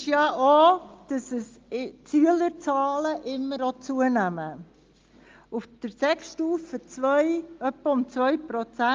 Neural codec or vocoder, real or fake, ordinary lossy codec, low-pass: none; real; Opus, 32 kbps; 7.2 kHz